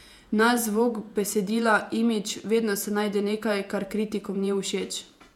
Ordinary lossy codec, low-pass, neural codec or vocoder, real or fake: MP3, 96 kbps; 19.8 kHz; vocoder, 48 kHz, 128 mel bands, Vocos; fake